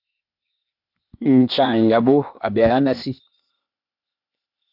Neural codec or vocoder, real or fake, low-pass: codec, 16 kHz, 0.8 kbps, ZipCodec; fake; 5.4 kHz